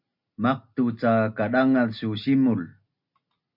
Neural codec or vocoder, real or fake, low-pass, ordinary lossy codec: none; real; 5.4 kHz; MP3, 48 kbps